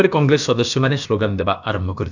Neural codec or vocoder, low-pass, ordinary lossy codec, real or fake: codec, 16 kHz, about 1 kbps, DyCAST, with the encoder's durations; 7.2 kHz; none; fake